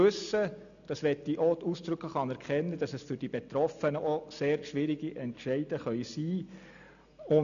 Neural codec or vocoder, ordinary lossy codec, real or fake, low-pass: none; none; real; 7.2 kHz